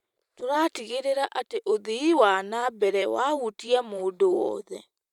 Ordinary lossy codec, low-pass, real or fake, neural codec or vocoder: none; 19.8 kHz; fake; vocoder, 44.1 kHz, 128 mel bands every 512 samples, BigVGAN v2